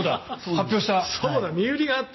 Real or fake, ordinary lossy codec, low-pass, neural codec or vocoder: real; MP3, 24 kbps; 7.2 kHz; none